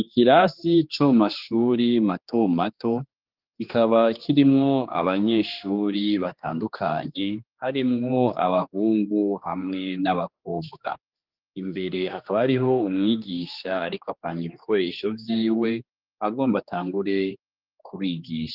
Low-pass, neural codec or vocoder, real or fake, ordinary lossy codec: 5.4 kHz; codec, 16 kHz, 2 kbps, X-Codec, HuBERT features, trained on general audio; fake; Opus, 32 kbps